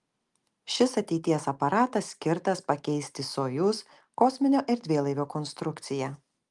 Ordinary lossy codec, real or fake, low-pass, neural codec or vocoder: Opus, 32 kbps; real; 10.8 kHz; none